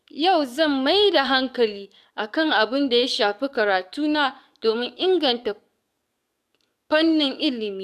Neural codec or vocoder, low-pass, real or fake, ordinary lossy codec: codec, 44.1 kHz, 7.8 kbps, DAC; 14.4 kHz; fake; none